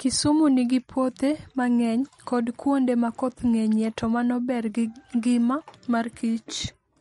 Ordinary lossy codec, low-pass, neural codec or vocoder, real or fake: MP3, 48 kbps; 19.8 kHz; none; real